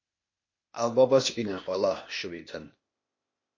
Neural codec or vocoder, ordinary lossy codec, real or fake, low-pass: codec, 16 kHz, 0.8 kbps, ZipCodec; MP3, 32 kbps; fake; 7.2 kHz